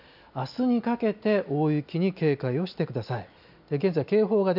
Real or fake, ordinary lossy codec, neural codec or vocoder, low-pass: real; none; none; 5.4 kHz